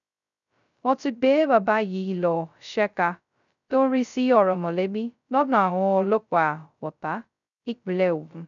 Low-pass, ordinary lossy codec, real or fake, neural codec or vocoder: 7.2 kHz; none; fake; codec, 16 kHz, 0.2 kbps, FocalCodec